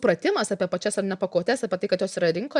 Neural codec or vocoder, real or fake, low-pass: none; real; 10.8 kHz